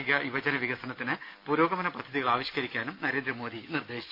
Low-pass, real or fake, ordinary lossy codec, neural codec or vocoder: 5.4 kHz; real; none; none